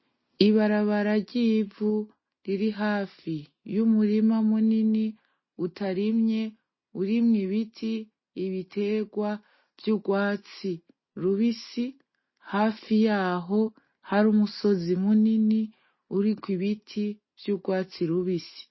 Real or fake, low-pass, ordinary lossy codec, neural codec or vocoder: real; 7.2 kHz; MP3, 24 kbps; none